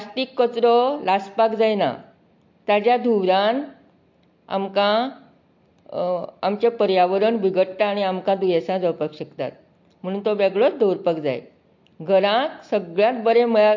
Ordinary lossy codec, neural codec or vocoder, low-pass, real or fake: MP3, 48 kbps; none; 7.2 kHz; real